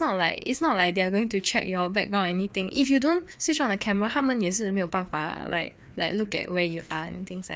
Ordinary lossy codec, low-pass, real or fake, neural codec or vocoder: none; none; fake; codec, 16 kHz, 2 kbps, FreqCodec, larger model